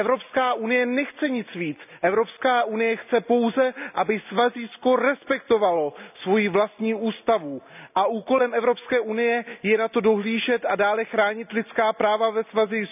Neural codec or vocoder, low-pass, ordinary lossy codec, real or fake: none; 3.6 kHz; none; real